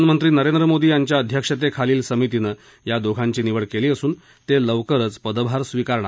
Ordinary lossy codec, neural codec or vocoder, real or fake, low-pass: none; none; real; none